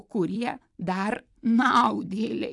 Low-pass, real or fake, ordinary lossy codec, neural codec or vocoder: 10.8 kHz; fake; AAC, 64 kbps; vocoder, 44.1 kHz, 128 mel bands every 256 samples, BigVGAN v2